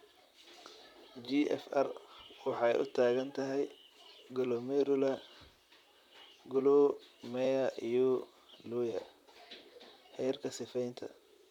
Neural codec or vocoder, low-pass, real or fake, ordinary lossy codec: vocoder, 44.1 kHz, 128 mel bands, Pupu-Vocoder; 19.8 kHz; fake; none